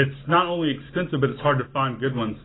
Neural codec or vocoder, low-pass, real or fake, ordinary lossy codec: none; 7.2 kHz; real; AAC, 16 kbps